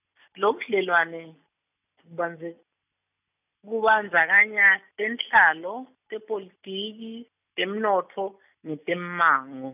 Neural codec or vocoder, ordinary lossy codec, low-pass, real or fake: none; none; 3.6 kHz; real